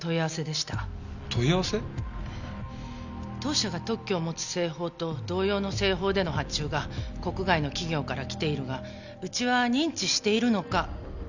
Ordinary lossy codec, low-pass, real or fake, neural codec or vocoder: none; 7.2 kHz; real; none